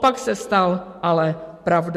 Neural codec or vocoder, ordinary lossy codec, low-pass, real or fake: none; MP3, 64 kbps; 14.4 kHz; real